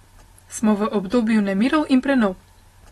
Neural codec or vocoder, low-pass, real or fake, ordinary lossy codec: none; 19.8 kHz; real; AAC, 32 kbps